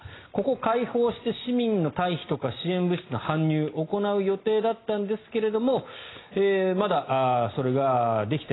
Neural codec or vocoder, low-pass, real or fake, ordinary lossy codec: none; 7.2 kHz; real; AAC, 16 kbps